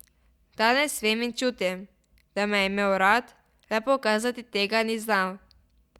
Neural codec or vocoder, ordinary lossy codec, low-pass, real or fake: none; Opus, 64 kbps; 19.8 kHz; real